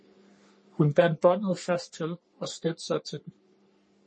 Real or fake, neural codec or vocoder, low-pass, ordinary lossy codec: fake; codec, 44.1 kHz, 3.4 kbps, Pupu-Codec; 9.9 kHz; MP3, 32 kbps